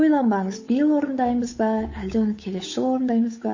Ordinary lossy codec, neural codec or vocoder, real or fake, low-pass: MP3, 32 kbps; codec, 16 kHz, 8 kbps, FunCodec, trained on Chinese and English, 25 frames a second; fake; 7.2 kHz